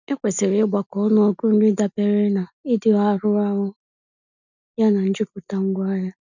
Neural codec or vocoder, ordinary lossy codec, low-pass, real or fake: none; none; 7.2 kHz; real